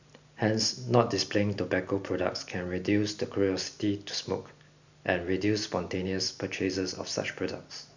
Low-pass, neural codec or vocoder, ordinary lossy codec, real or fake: 7.2 kHz; none; none; real